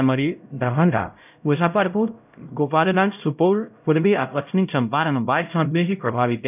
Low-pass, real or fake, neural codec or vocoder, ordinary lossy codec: 3.6 kHz; fake; codec, 16 kHz, 0.5 kbps, X-Codec, HuBERT features, trained on LibriSpeech; none